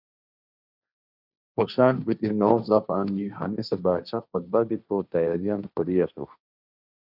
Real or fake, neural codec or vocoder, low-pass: fake; codec, 16 kHz, 1.1 kbps, Voila-Tokenizer; 5.4 kHz